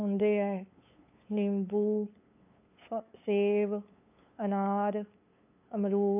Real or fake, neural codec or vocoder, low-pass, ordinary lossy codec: fake; codec, 16 kHz, 8 kbps, FunCodec, trained on LibriTTS, 25 frames a second; 3.6 kHz; AAC, 32 kbps